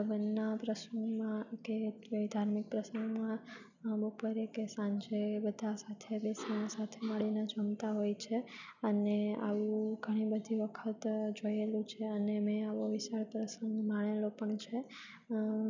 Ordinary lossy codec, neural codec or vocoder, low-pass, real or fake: none; none; 7.2 kHz; real